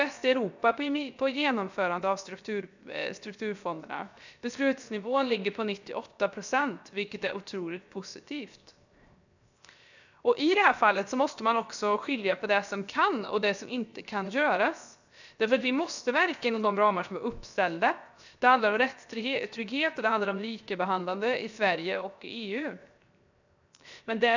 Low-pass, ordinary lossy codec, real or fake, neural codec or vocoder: 7.2 kHz; none; fake; codec, 16 kHz, 0.7 kbps, FocalCodec